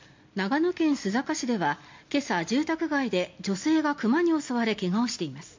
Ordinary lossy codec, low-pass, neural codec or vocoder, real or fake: MP3, 48 kbps; 7.2 kHz; none; real